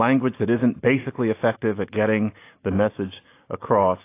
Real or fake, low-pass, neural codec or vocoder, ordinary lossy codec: real; 3.6 kHz; none; AAC, 24 kbps